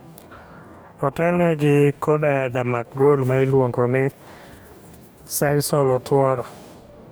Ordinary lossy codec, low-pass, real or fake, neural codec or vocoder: none; none; fake; codec, 44.1 kHz, 2.6 kbps, DAC